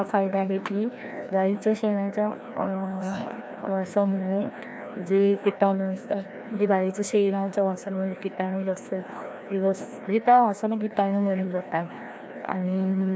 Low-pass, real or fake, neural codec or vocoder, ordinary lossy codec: none; fake; codec, 16 kHz, 1 kbps, FreqCodec, larger model; none